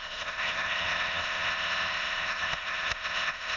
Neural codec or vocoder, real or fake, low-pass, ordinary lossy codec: autoencoder, 22.05 kHz, a latent of 192 numbers a frame, VITS, trained on many speakers; fake; 7.2 kHz; none